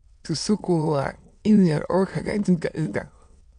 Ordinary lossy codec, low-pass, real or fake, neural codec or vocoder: none; 9.9 kHz; fake; autoencoder, 22.05 kHz, a latent of 192 numbers a frame, VITS, trained on many speakers